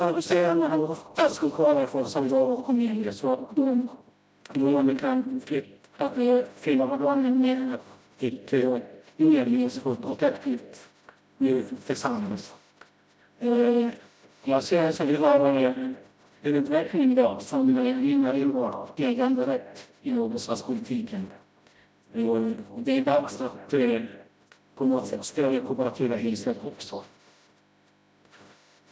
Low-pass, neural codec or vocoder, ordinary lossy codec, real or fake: none; codec, 16 kHz, 0.5 kbps, FreqCodec, smaller model; none; fake